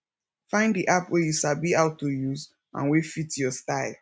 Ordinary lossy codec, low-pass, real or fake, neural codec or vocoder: none; none; real; none